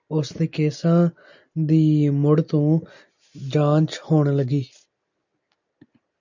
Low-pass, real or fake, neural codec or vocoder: 7.2 kHz; real; none